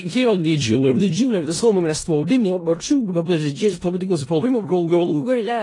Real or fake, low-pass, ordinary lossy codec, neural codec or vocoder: fake; 10.8 kHz; AAC, 32 kbps; codec, 16 kHz in and 24 kHz out, 0.4 kbps, LongCat-Audio-Codec, four codebook decoder